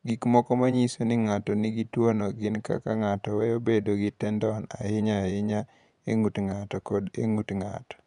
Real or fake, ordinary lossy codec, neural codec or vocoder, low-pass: fake; none; vocoder, 24 kHz, 100 mel bands, Vocos; 10.8 kHz